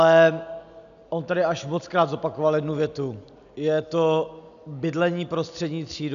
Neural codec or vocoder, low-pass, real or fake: none; 7.2 kHz; real